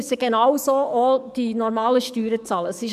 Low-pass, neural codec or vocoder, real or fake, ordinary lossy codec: 14.4 kHz; codec, 44.1 kHz, 7.8 kbps, DAC; fake; none